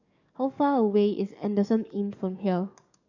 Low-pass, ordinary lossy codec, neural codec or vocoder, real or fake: 7.2 kHz; none; codec, 44.1 kHz, 7.8 kbps, DAC; fake